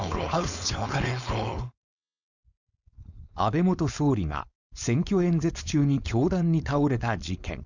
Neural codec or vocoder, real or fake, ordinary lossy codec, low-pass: codec, 16 kHz, 4.8 kbps, FACodec; fake; none; 7.2 kHz